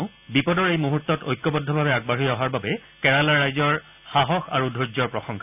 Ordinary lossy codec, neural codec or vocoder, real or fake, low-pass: none; none; real; 3.6 kHz